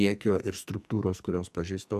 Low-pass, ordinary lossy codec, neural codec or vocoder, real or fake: 14.4 kHz; AAC, 96 kbps; codec, 44.1 kHz, 2.6 kbps, SNAC; fake